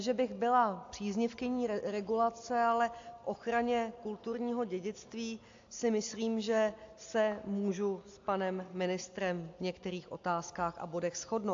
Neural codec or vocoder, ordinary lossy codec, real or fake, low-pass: none; AAC, 48 kbps; real; 7.2 kHz